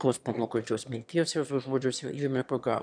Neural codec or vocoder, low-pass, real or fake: autoencoder, 22.05 kHz, a latent of 192 numbers a frame, VITS, trained on one speaker; 9.9 kHz; fake